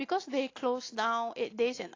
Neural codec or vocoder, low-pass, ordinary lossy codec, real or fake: none; 7.2 kHz; AAC, 32 kbps; real